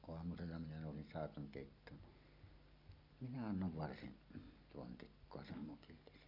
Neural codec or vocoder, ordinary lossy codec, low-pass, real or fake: none; none; 5.4 kHz; real